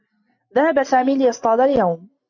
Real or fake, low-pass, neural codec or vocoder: real; 7.2 kHz; none